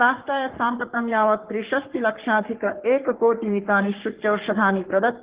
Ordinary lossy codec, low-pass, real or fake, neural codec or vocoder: Opus, 16 kbps; 3.6 kHz; fake; codec, 44.1 kHz, 3.4 kbps, Pupu-Codec